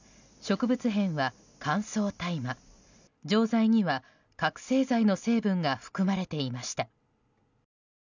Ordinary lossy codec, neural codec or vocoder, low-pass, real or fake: none; none; 7.2 kHz; real